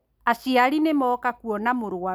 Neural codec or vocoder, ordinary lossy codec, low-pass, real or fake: none; none; none; real